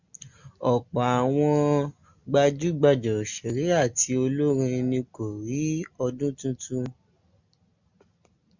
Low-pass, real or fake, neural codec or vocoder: 7.2 kHz; real; none